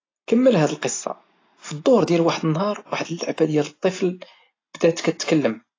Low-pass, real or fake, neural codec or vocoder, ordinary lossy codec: 7.2 kHz; real; none; AAC, 32 kbps